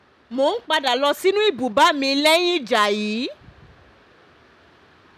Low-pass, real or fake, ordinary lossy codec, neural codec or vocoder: 14.4 kHz; real; none; none